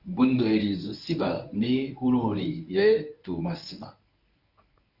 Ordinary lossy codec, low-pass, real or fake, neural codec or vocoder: Opus, 64 kbps; 5.4 kHz; fake; codec, 24 kHz, 0.9 kbps, WavTokenizer, medium speech release version 1